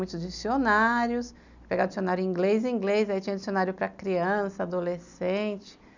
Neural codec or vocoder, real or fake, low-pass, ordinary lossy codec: none; real; 7.2 kHz; none